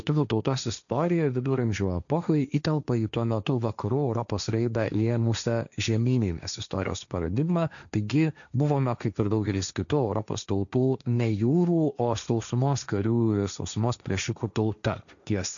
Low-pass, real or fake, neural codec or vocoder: 7.2 kHz; fake; codec, 16 kHz, 1.1 kbps, Voila-Tokenizer